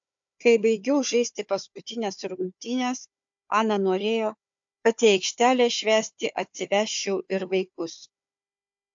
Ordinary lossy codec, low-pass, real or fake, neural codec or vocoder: AAC, 64 kbps; 7.2 kHz; fake; codec, 16 kHz, 4 kbps, FunCodec, trained on Chinese and English, 50 frames a second